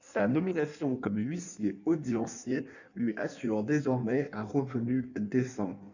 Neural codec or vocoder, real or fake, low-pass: codec, 16 kHz in and 24 kHz out, 1.1 kbps, FireRedTTS-2 codec; fake; 7.2 kHz